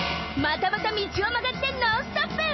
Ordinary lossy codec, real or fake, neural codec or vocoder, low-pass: MP3, 24 kbps; real; none; 7.2 kHz